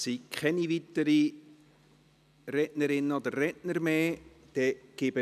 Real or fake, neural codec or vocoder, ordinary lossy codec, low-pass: real; none; none; 14.4 kHz